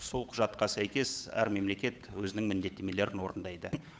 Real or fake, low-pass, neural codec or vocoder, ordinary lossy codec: fake; none; codec, 16 kHz, 8 kbps, FunCodec, trained on Chinese and English, 25 frames a second; none